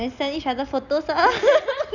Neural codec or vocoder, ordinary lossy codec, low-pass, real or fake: none; none; 7.2 kHz; real